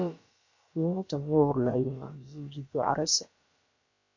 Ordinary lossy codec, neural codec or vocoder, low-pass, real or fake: MP3, 48 kbps; codec, 16 kHz, about 1 kbps, DyCAST, with the encoder's durations; 7.2 kHz; fake